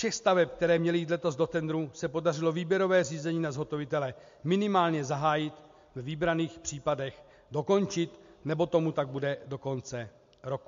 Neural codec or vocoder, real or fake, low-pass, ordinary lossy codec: none; real; 7.2 kHz; MP3, 48 kbps